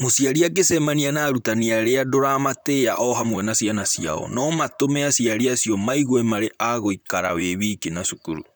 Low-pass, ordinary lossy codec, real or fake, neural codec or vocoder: none; none; fake; vocoder, 44.1 kHz, 128 mel bands, Pupu-Vocoder